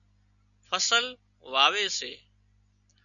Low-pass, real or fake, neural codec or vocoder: 7.2 kHz; real; none